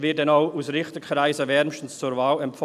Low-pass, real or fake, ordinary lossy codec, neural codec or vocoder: 14.4 kHz; real; none; none